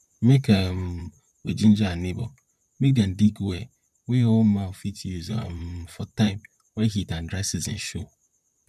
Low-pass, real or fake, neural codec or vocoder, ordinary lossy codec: 14.4 kHz; fake; vocoder, 44.1 kHz, 128 mel bands, Pupu-Vocoder; none